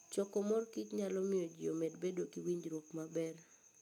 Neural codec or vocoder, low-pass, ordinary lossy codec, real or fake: none; 19.8 kHz; none; real